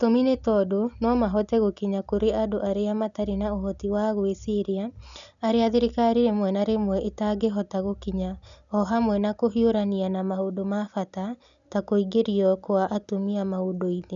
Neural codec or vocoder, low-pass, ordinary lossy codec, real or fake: none; 7.2 kHz; none; real